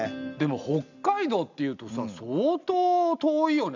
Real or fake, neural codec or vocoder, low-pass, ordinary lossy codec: real; none; 7.2 kHz; none